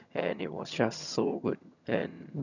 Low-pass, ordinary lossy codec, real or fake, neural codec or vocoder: 7.2 kHz; none; fake; vocoder, 22.05 kHz, 80 mel bands, HiFi-GAN